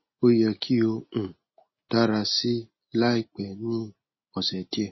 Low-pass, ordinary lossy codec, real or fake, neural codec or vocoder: 7.2 kHz; MP3, 24 kbps; real; none